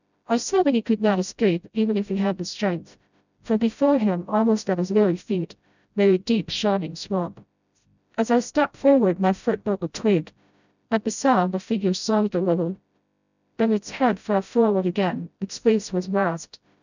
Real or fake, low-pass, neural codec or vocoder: fake; 7.2 kHz; codec, 16 kHz, 0.5 kbps, FreqCodec, smaller model